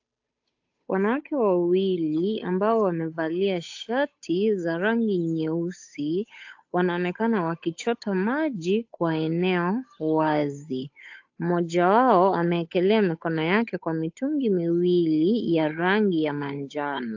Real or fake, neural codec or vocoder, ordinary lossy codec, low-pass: fake; codec, 16 kHz, 8 kbps, FunCodec, trained on Chinese and English, 25 frames a second; AAC, 48 kbps; 7.2 kHz